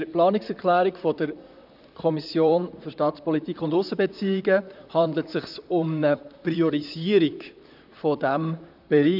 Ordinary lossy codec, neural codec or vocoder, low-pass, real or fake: none; vocoder, 44.1 kHz, 128 mel bands, Pupu-Vocoder; 5.4 kHz; fake